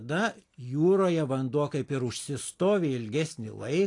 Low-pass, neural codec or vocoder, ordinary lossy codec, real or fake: 10.8 kHz; none; AAC, 48 kbps; real